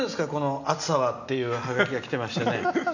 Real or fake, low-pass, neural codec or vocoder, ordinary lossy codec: real; 7.2 kHz; none; none